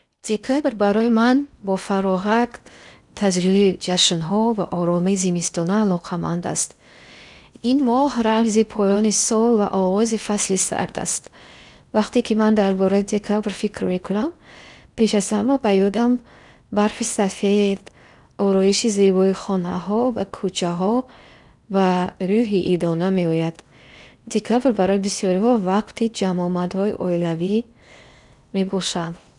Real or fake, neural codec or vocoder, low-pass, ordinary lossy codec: fake; codec, 16 kHz in and 24 kHz out, 0.8 kbps, FocalCodec, streaming, 65536 codes; 10.8 kHz; none